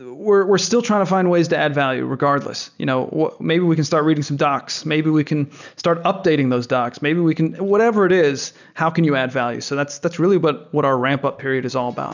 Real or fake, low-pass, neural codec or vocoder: real; 7.2 kHz; none